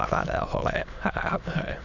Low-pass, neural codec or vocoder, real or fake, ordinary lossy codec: 7.2 kHz; autoencoder, 22.05 kHz, a latent of 192 numbers a frame, VITS, trained on many speakers; fake; none